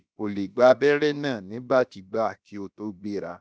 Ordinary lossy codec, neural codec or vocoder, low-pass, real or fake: none; codec, 16 kHz, about 1 kbps, DyCAST, with the encoder's durations; none; fake